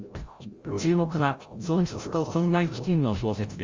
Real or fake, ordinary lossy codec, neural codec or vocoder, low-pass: fake; Opus, 32 kbps; codec, 16 kHz, 0.5 kbps, FreqCodec, larger model; 7.2 kHz